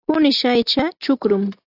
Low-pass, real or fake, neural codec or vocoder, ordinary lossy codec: 5.4 kHz; real; none; AAC, 32 kbps